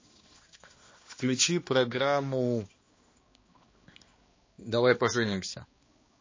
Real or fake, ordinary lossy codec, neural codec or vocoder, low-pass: fake; MP3, 32 kbps; codec, 16 kHz, 2 kbps, X-Codec, HuBERT features, trained on balanced general audio; 7.2 kHz